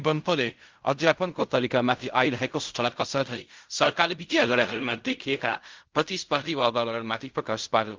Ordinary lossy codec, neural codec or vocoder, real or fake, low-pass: Opus, 24 kbps; codec, 16 kHz in and 24 kHz out, 0.4 kbps, LongCat-Audio-Codec, fine tuned four codebook decoder; fake; 7.2 kHz